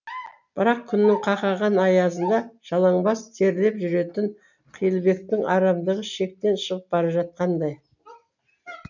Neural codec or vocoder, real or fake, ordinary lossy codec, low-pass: none; real; none; 7.2 kHz